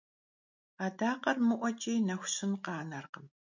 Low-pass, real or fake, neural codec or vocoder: 7.2 kHz; real; none